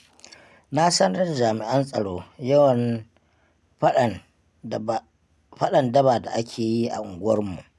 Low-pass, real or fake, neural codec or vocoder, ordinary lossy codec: none; real; none; none